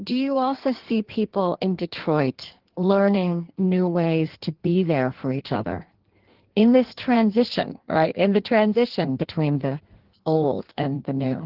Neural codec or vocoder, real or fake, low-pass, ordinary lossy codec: codec, 16 kHz in and 24 kHz out, 1.1 kbps, FireRedTTS-2 codec; fake; 5.4 kHz; Opus, 16 kbps